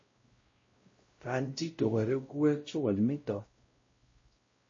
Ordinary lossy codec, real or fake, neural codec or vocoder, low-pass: MP3, 32 kbps; fake; codec, 16 kHz, 0.5 kbps, X-Codec, WavLM features, trained on Multilingual LibriSpeech; 7.2 kHz